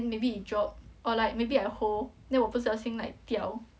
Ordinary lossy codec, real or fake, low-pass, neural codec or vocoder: none; real; none; none